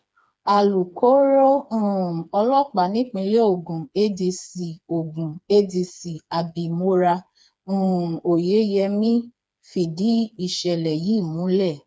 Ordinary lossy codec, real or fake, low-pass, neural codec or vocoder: none; fake; none; codec, 16 kHz, 4 kbps, FreqCodec, smaller model